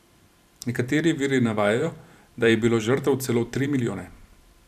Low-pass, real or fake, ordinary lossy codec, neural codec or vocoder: 14.4 kHz; fake; none; vocoder, 44.1 kHz, 128 mel bands every 512 samples, BigVGAN v2